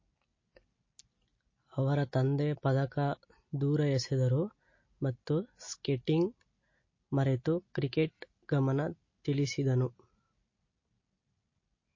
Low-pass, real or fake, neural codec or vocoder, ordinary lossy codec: 7.2 kHz; real; none; MP3, 32 kbps